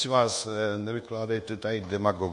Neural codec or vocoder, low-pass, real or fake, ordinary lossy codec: codec, 24 kHz, 1.2 kbps, DualCodec; 10.8 kHz; fake; MP3, 48 kbps